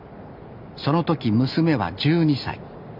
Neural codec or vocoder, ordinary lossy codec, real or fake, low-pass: none; AAC, 48 kbps; real; 5.4 kHz